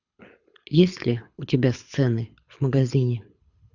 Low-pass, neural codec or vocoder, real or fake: 7.2 kHz; codec, 24 kHz, 6 kbps, HILCodec; fake